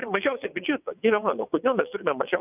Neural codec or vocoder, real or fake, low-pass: vocoder, 22.05 kHz, 80 mel bands, WaveNeXt; fake; 3.6 kHz